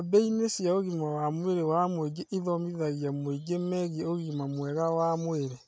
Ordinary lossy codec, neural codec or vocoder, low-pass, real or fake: none; none; none; real